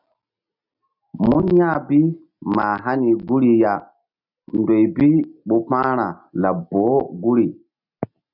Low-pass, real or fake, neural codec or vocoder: 5.4 kHz; real; none